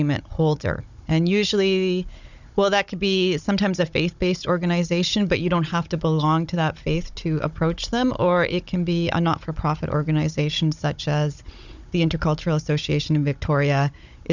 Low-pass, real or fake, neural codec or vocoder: 7.2 kHz; fake; codec, 16 kHz, 16 kbps, FunCodec, trained on Chinese and English, 50 frames a second